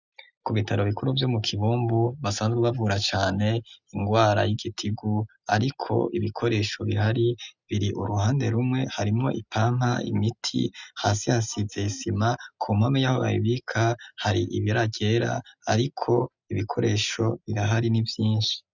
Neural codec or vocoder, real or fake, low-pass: none; real; 7.2 kHz